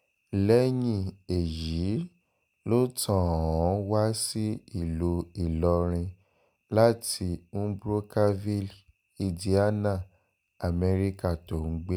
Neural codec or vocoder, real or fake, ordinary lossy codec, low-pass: none; real; none; none